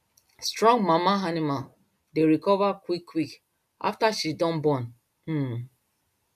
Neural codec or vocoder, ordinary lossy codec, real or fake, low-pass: none; none; real; 14.4 kHz